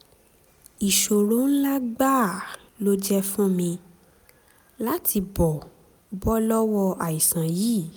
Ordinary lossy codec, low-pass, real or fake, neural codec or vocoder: none; none; real; none